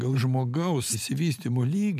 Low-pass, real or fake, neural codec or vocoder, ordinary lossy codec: 14.4 kHz; fake; vocoder, 44.1 kHz, 128 mel bands every 512 samples, BigVGAN v2; MP3, 96 kbps